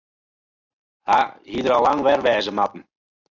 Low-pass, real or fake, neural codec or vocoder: 7.2 kHz; real; none